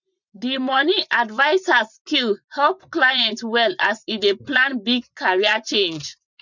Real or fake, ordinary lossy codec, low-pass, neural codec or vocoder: fake; none; 7.2 kHz; vocoder, 24 kHz, 100 mel bands, Vocos